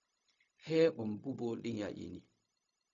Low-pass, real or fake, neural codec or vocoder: 7.2 kHz; fake; codec, 16 kHz, 0.4 kbps, LongCat-Audio-Codec